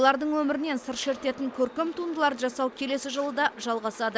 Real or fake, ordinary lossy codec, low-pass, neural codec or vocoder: real; none; none; none